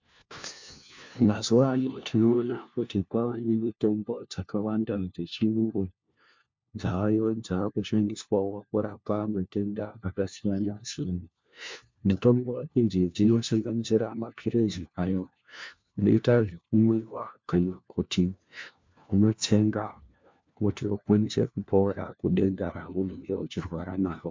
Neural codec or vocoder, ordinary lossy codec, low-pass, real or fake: codec, 16 kHz, 1 kbps, FunCodec, trained on LibriTTS, 50 frames a second; MP3, 64 kbps; 7.2 kHz; fake